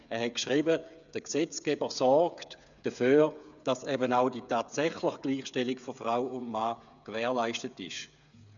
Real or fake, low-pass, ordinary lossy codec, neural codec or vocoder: fake; 7.2 kHz; none; codec, 16 kHz, 8 kbps, FreqCodec, smaller model